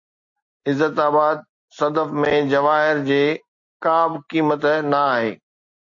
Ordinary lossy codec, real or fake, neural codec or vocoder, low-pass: AAC, 48 kbps; real; none; 7.2 kHz